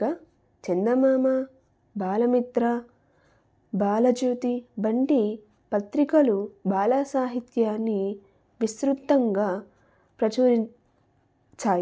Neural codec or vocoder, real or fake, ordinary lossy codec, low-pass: none; real; none; none